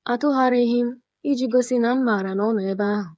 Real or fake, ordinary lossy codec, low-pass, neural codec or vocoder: fake; none; none; codec, 16 kHz, 8 kbps, FreqCodec, smaller model